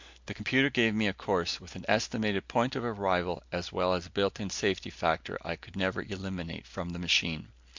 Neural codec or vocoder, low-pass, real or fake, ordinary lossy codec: none; 7.2 kHz; real; MP3, 64 kbps